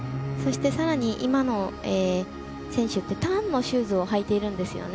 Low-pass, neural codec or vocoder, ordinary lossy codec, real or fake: none; none; none; real